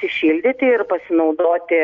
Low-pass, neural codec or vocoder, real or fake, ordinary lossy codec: 7.2 kHz; none; real; MP3, 64 kbps